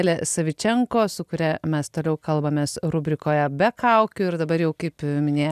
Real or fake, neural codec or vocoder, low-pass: real; none; 14.4 kHz